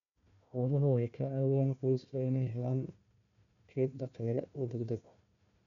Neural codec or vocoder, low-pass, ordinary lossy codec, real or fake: codec, 16 kHz, 1 kbps, FunCodec, trained on Chinese and English, 50 frames a second; 7.2 kHz; none; fake